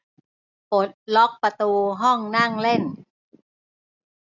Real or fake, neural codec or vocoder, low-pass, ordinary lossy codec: real; none; 7.2 kHz; none